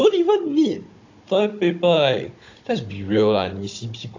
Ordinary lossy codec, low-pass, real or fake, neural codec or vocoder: AAC, 48 kbps; 7.2 kHz; fake; codec, 16 kHz, 16 kbps, FunCodec, trained on Chinese and English, 50 frames a second